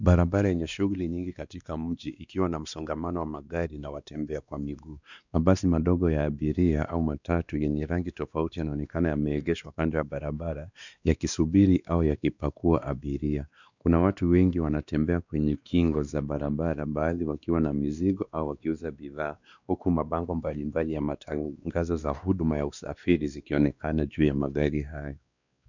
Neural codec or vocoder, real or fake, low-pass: codec, 16 kHz, 2 kbps, X-Codec, WavLM features, trained on Multilingual LibriSpeech; fake; 7.2 kHz